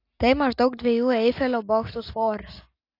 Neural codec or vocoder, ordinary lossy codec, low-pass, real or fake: none; AAC, 32 kbps; 5.4 kHz; real